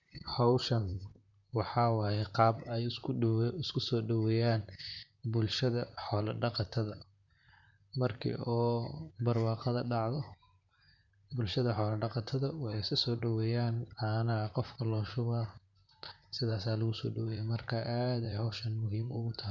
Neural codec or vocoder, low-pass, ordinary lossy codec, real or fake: vocoder, 44.1 kHz, 128 mel bands, Pupu-Vocoder; 7.2 kHz; none; fake